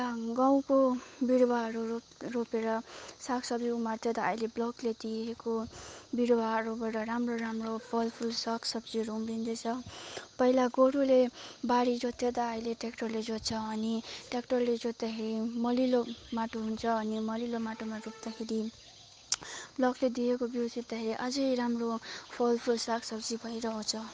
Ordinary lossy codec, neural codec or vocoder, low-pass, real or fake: none; codec, 16 kHz, 8 kbps, FunCodec, trained on Chinese and English, 25 frames a second; none; fake